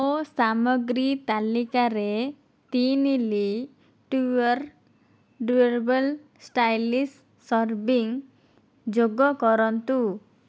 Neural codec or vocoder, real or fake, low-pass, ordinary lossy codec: none; real; none; none